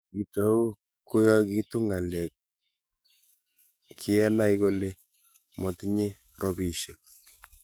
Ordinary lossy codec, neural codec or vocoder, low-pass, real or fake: none; codec, 44.1 kHz, 7.8 kbps, DAC; none; fake